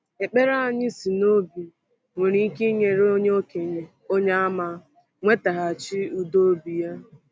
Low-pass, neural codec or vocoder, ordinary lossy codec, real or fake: none; none; none; real